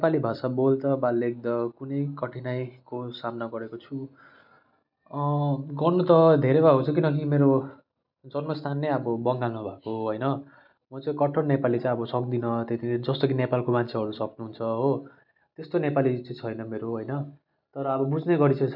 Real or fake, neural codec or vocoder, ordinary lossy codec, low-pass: real; none; none; 5.4 kHz